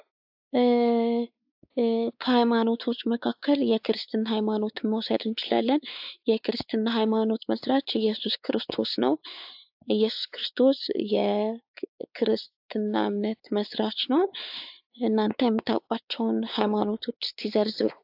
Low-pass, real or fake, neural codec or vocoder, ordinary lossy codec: 5.4 kHz; fake; codec, 16 kHz, 4 kbps, X-Codec, WavLM features, trained on Multilingual LibriSpeech; AAC, 48 kbps